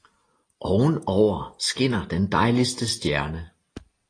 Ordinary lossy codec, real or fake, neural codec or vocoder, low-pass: AAC, 32 kbps; real; none; 9.9 kHz